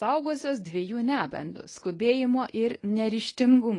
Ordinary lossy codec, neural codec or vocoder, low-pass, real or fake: AAC, 32 kbps; codec, 24 kHz, 0.9 kbps, WavTokenizer, medium speech release version 1; 10.8 kHz; fake